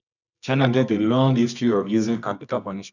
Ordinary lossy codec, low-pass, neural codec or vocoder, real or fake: none; 7.2 kHz; codec, 24 kHz, 0.9 kbps, WavTokenizer, medium music audio release; fake